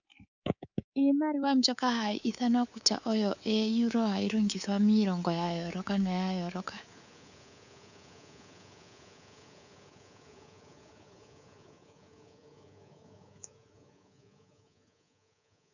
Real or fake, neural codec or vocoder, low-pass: fake; codec, 24 kHz, 3.1 kbps, DualCodec; 7.2 kHz